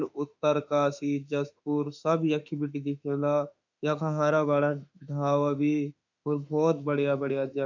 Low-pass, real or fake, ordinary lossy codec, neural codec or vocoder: 7.2 kHz; fake; none; autoencoder, 48 kHz, 32 numbers a frame, DAC-VAE, trained on Japanese speech